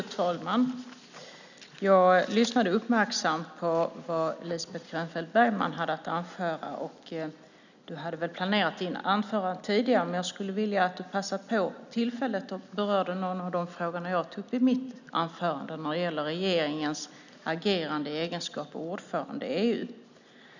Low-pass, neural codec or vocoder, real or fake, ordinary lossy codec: 7.2 kHz; none; real; none